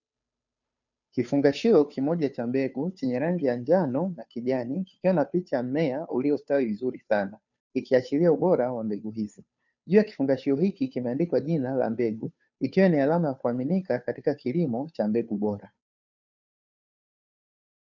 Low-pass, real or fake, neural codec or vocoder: 7.2 kHz; fake; codec, 16 kHz, 2 kbps, FunCodec, trained on Chinese and English, 25 frames a second